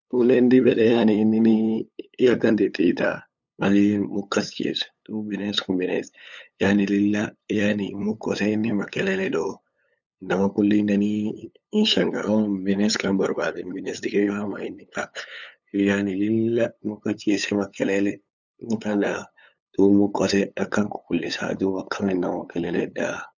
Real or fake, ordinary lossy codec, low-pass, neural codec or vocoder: fake; none; 7.2 kHz; codec, 16 kHz, 8 kbps, FunCodec, trained on LibriTTS, 25 frames a second